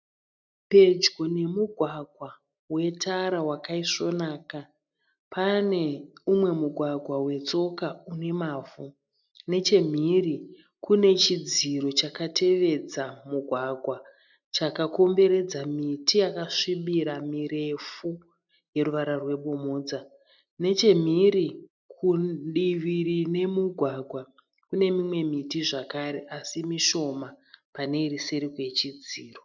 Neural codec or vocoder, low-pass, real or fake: none; 7.2 kHz; real